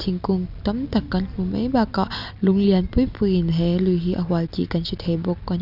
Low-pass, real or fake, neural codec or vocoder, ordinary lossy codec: 5.4 kHz; real; none; none